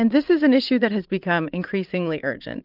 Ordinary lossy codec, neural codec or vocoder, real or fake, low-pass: Opus, 24 kbps; vocoder, 44.1 kHz, 80 mel bands, Vocos; fake; 5.4 kHz